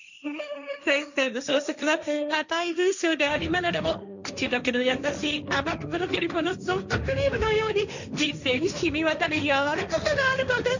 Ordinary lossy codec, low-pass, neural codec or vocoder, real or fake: none; 7.2 kHz; codec, 16 kHz, 1.1 kbps, Voila-Tokenizer; fake